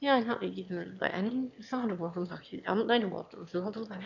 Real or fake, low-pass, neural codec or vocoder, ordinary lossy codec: fake; 7.2 kHz; autoencoder, 22.05 kHz, a latent of 192 numbers a frame, VITS, trained on one speaker; none